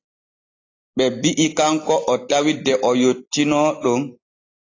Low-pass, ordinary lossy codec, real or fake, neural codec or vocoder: 7.2 kHz; AAC, 32 kbps; real; none